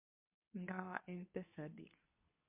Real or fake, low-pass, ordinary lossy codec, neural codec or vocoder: fake; 3.6 kHz; MP3, 32 kbps; codec, 24 kHz, 0.9 kbps, WavTokenizer, medium speech release version 2